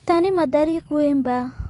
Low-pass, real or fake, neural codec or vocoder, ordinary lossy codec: 10.8 kHz; fake; vocoder, 24 kHz, 100 mel bands, Vocos; AAC, 48 kbps